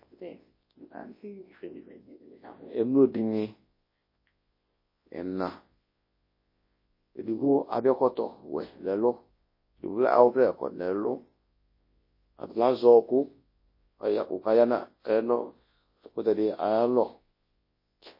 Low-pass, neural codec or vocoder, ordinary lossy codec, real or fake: 5.4 kHz; codec, 24 kHz, 0.9 kbps, WavTokenizer, large speech release; MP3, 24 kbps; fake